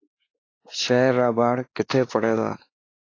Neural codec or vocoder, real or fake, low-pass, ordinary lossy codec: codec, 16 kHz, 2 kbps, X-Codec, WavLM features, trained on Multilingual LibriSpeech; fake; 7.2 kHz; AAC, 32 kbps